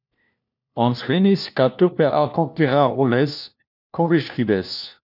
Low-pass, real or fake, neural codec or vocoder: 5.4 kHz; fake; codec, 16 kHz, 1 kbps, FunCodec, trained on LibriTTS, 50 frames a second